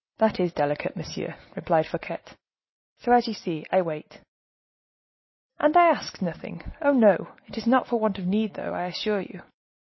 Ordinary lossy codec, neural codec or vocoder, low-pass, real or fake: MP3, 24 kbps; none; 7.2 kHz; real